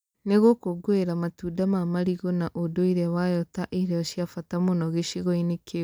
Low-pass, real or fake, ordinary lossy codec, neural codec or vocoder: none; real; none; none